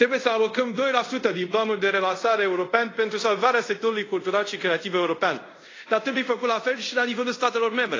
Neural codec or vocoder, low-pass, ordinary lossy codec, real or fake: codec, 24 kHz, 0.5 kbps, DualCodec; 7.2 kHz; AAC, 32 kbps; fake